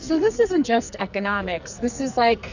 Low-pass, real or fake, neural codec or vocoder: 7.2 kHz; fake; codec, 44.1 kHz, 2.6 kbps, SNAC